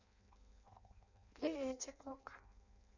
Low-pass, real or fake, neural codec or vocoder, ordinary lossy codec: 7.2 kHz; fake; codec, 16 kHz in and 24 kHz out, 0.6 kbps, FireRedTTS-2 codec; none